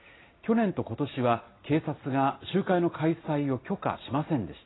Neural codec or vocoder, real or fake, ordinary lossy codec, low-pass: none; real; AAC, 16 kbps; 7.2 kHz